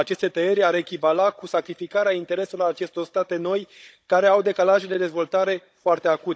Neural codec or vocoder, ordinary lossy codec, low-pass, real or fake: codec, 16 kHz, 16 kbps, FunCodec, trained on Chinese and English, 50 frames a second; none; none; fake